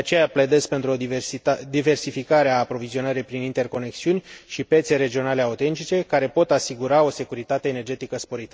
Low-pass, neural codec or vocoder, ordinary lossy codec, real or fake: none; none; none; real